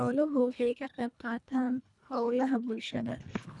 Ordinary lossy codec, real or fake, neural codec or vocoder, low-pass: none; fake; codec, 24 kHz, 1.5 kbps, HILCodec; 10.8 kHz